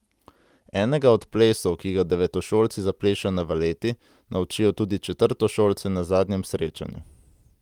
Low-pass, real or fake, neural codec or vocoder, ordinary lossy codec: 19.8 kHz; real; none; Opus, 32 kbps